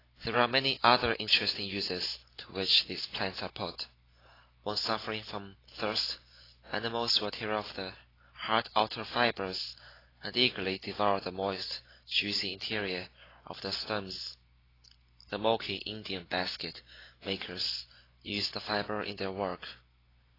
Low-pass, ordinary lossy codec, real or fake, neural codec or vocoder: 5.4 kHz; AAC, 24 kbps; real; none